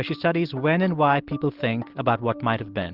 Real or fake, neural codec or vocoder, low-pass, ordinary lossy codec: real; none; 5.4 kHz; Opus, 16 kbps